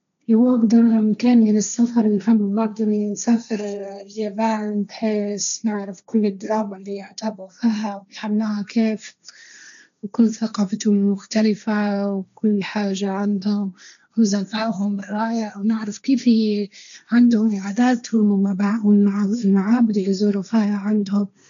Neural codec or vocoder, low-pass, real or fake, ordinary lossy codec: codec, 16 kHz, 1.1 kbps, Voila-Tokenizer; 7.2 kHz; fake; none